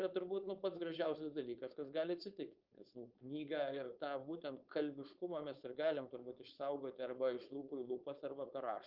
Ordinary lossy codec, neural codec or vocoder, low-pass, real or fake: Opus, 24 kbps; codec, 16 kHz, 4.8 kbps, FACodec; 5.4 kHz; fake